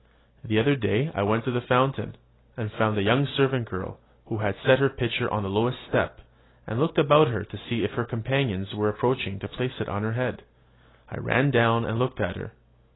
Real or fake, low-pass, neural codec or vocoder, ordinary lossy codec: real; 7.2 kHz; none; AAC, 16 kbps